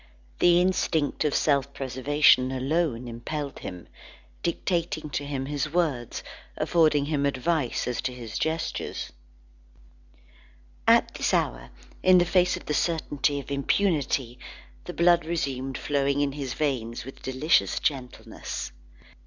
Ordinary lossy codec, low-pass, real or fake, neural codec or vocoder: Opus, 64 kbps; 7.2 kHz; real; none